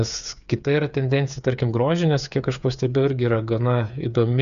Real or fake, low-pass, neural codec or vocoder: fake; 7.2 kHz; codec, 16 kHz, 8 kbps, FreqCodec, smaller model